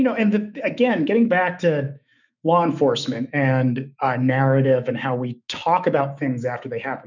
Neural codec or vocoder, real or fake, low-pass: autoencoder, 48 kHz, 128 numbers a frame, DAC-VAE, trained on Japanese speech; fake; 7.2 kHz